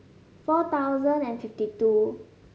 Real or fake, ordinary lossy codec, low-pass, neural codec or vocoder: real; none; none; none